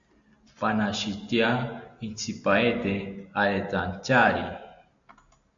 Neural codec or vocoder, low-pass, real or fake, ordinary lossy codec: none; 7.2 kHz; real; AAC, 64 kbps